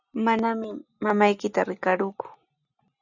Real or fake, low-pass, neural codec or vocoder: real; 7.2 kHz; none